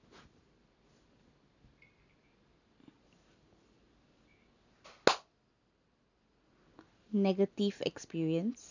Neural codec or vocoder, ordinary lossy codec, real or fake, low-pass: none; none; real; 7.2 kHz